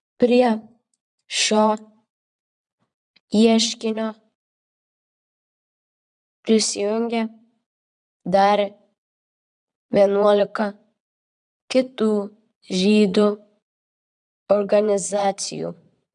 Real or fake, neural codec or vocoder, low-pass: fake; vocoder, 22.05 kHz, 80 mel bands, Vocos; 9.9 kHz